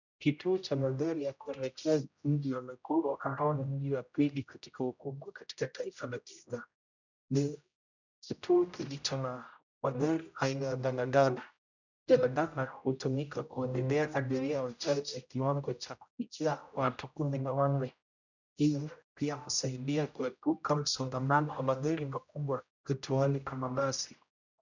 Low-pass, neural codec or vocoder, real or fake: 7.2 kHz; codec, 16 kHz, 0.5 kbps, X-Codec, HuBERT features, trained on general audio; fake